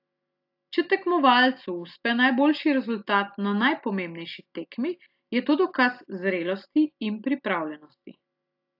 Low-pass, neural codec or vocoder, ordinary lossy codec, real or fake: 5.4 kHz; none; AAC, 48 kbps; real